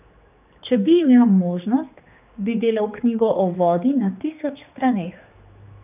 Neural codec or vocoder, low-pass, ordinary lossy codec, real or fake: codec, 16 kHz, 2 kbps, X-Codec, HuBERT features, trained on general audio; 3.6 kHz; none; fake